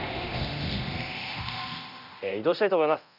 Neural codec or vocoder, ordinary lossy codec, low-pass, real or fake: codec, 24 kHz, 0.9 kbps, DualCodec; none; 5.4 kHz; fake